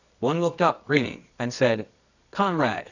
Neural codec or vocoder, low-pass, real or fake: codec, 24 kHz, 0.9 kbps, WavTokenizer, medium music audio release; 7.2 kHz; fake